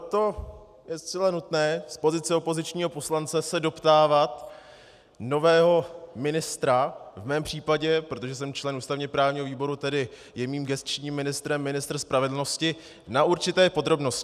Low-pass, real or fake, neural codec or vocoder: 14.4 kHz; real; none